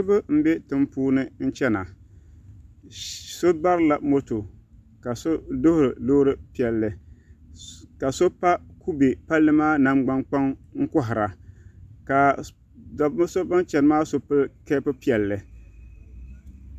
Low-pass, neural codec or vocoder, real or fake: 14.4 kHz; none; real